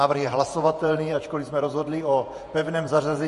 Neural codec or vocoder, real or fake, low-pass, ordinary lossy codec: vocoder, 48 kHz, 128 mel bands, Vocos; fake; 14.4 kHz; MP3, 48 kbps